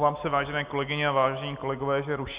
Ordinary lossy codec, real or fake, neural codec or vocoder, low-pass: Opus, 64 kbps; real; none; 3.6 kHz